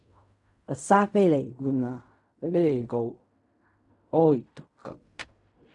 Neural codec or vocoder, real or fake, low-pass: codec, 16 kHz in and 24 kHz out, 0.4 kbps, LongCat-Audio-Codec, fine tuned four codebook decoder; fake; 10.8 kHz